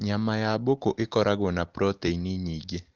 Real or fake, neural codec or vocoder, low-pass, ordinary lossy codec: real; none; 7.2 kHz; Opus, 16 kbps